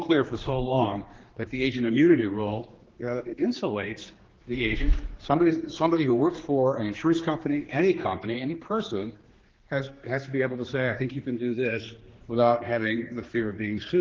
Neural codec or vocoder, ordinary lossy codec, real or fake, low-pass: codec, 16 kHz, 2 kbps, X-Codec, HuBERT features, trained on general audio; Opus, 16 kbps; fake; 7.2 kHz